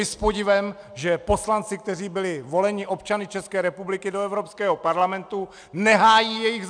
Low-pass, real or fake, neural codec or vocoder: 9.9 kHz; real; none